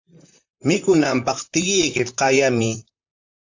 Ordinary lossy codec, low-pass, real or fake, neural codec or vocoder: AAC, 48 kbps; 7.2 kHz; fake; vocoder, 44.1 kHz, 128 mel bands, Pupu-Vocoder